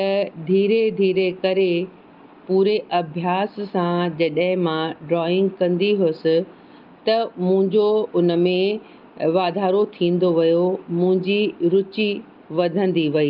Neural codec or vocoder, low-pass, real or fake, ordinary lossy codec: none; 5.4 kHz; real; Opus, 32 kbps